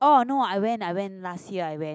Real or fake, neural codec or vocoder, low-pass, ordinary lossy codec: real; none; none; none